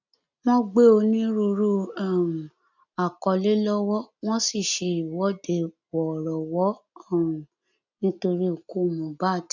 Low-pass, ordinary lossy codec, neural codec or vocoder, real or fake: 7.2 kHz; none; none; real